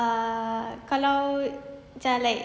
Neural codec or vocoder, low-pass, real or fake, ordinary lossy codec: none; none; real; none